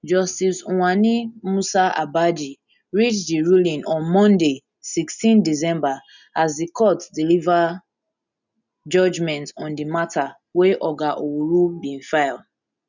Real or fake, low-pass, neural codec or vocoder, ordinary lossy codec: real; 7.2 kHz; none; none